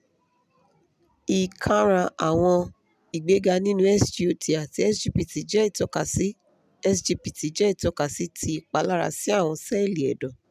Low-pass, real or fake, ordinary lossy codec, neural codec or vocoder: 14.4 kHz; real; none; none